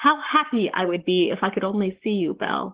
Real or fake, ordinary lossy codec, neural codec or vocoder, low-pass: fake; Opus, 16 kbps; codec, 44.1 kHz, 7.8 kbps, Pupu-Codec; 3.6 kHz